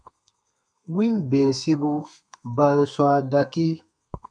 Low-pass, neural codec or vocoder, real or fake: 9.9 kHz; codec, 32 kHz, 1.9 kbps, SNAC; fake